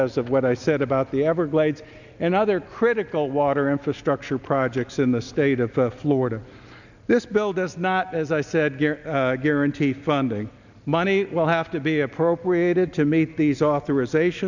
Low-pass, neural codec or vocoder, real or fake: 7.2 kHz; none; real